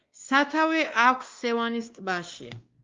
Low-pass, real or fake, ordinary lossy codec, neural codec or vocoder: 7.2 kHz; fake; Opus, 32 kbps; codec, 16 kHz, 2 kbps, X-Codec, WavLM features, trained on Multilingual LibriSpeech